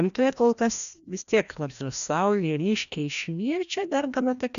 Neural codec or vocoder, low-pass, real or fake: codec, 16 kHz, 1 kbps, FreqCodec, larger model; 7.2 kHz; fake